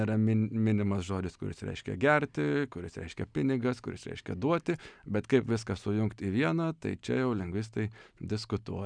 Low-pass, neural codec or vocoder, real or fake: 9.9 kHz; none; real